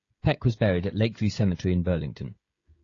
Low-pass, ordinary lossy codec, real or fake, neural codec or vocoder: 7.2 kHz; AAC, 32 kbps; fake; codec, 16 kHz, 16 kbps, FreqCodec, smaller model